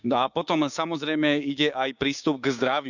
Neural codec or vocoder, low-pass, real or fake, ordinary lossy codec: codec, 16 kHz, 6 kbps, DAC; 7.2 kHz; fake; none